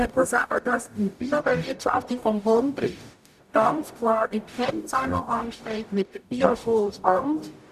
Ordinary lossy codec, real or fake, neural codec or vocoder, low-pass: none; fake; codec, 44.1 kHz, 0.9 kbps, DAC; 14.4 kHz